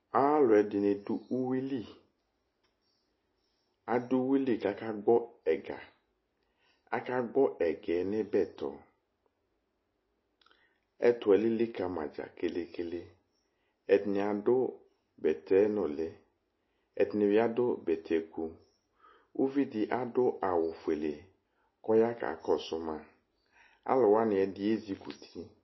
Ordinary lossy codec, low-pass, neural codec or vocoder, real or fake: MP3, 24 kbps; 7.2 kHz; none; real